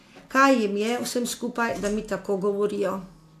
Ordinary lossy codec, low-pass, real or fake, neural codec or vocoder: AAC, 64 kbps; 14.4 kHz; real; none